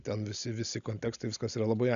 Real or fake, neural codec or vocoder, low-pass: real; none; 7.2 kHz